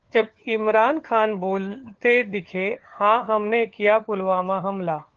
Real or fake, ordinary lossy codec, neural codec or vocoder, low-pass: fake; Opus, 16 kbps; codec, 16 kHz, 4 kbps, FunCodec, trained on LibriTTS, 50 frames a second; 7.2 kHz